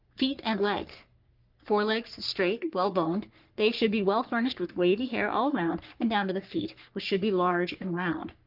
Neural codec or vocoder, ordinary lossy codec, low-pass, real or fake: codec, 44.1 kHz, 3.4 kbps, Pupu-Codec; Opus, 24 kbps; 5.4 kHz; fake